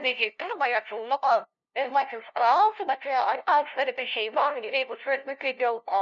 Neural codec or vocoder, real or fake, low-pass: codec, 16 kHz, 0.5 kbps, FunCodec, trained on LibriTTS, 25 frames a second; fake; 7.2 kHz